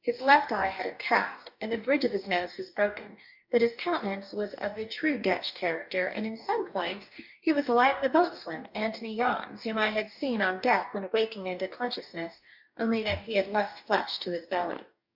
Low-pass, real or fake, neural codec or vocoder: 5.4 kHz; fake; codec, 44.1 kHz, 2.6 kbps, DAC